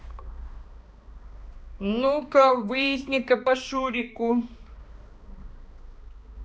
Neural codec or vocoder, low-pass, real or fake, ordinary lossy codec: codec, 16 kHz, 2 kbps, X-Codec, HuBERT features, trained on balanced general audio; none; fake; none